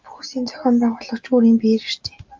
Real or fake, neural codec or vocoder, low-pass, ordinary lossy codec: real; none; 7.2 kHz; Opus, 24 kbps